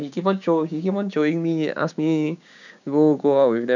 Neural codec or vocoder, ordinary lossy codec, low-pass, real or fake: codec, 16 kHz, 6 kbps, DAC; none; 7.2 kHz; fake